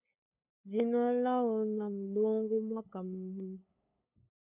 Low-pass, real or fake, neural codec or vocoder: 3.6 kHz; fake; codec, 16 kHz, 8 kbps, FunCodec, trained on LibriTTS, 25 frames a second